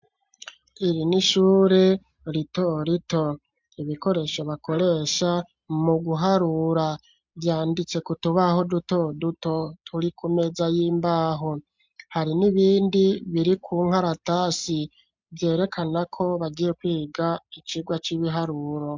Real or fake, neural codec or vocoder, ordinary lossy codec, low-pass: real; none; MP3, 64 kbps; 7.2 kHz